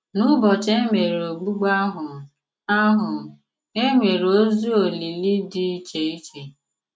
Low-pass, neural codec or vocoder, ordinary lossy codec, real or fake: none; none; none; real